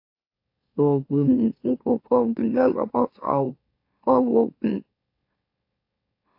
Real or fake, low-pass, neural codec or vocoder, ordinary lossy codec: fake; 5.4 kHz; autoencoder, 44.1 kHz, a latent of 192 numbers a frame, MeloTTS; AAC, 32 kbps